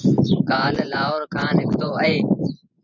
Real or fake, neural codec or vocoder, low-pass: fake; vocoder, 24 kHz, 100 mel bands, Vocos; 7.2 kHz